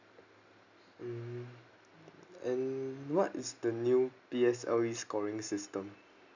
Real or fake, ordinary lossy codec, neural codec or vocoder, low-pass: real; none; none; 7.2 kHz